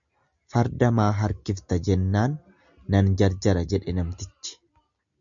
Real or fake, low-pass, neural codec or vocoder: real; 7.2 kHz; none